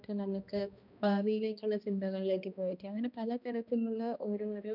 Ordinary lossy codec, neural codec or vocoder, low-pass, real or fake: none; codec, 16 kHz, 1 kbps, X-Codec, HuBERT features, trained on balanced general audio; 5.4 kHz; fake